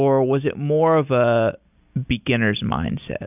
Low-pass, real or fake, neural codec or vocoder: 3.6 kHz; real; none